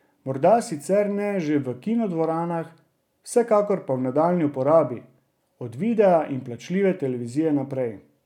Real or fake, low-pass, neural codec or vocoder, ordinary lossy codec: real; 19.8 kHz; none; none